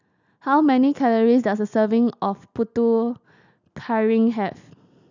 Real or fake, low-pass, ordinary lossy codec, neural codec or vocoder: real; 7.2 kHz; none; none